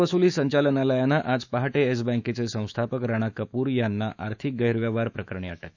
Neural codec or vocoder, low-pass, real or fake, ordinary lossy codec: autoencoder, 48 kHz, 128 numbers a frame, DAC-VAE, trained on Japanese speech; 7.2 kHz; fake; none